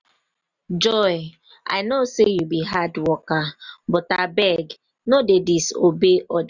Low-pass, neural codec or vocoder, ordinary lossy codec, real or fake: 7.2 kHz; none; none; real